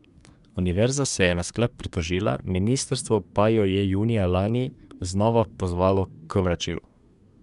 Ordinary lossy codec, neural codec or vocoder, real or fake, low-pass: none; codec, 24 kHz, 1 kbps, SNAC; fake; 10.8 kHz